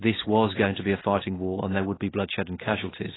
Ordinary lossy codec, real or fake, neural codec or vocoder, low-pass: AAC, 16 kbps; real; none; 7.2 kHz